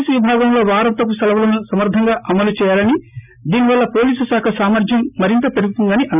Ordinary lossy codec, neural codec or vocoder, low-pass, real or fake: none; none; 3.6 kHz; real